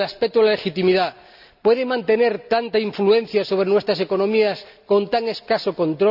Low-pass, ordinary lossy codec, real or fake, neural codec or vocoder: 5.4 kHz; none; real; none